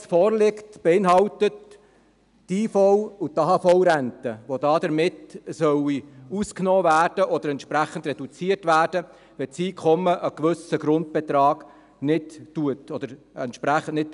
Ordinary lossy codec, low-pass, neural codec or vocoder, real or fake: none; 10.8 kHz; none; real